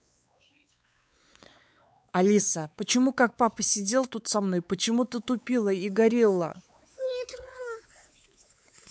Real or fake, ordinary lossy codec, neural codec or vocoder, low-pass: fake; none; codec, 16 kHz, 4 kbps, X-Codec, WavLM features, trained on Multilingual LibriSpeech; none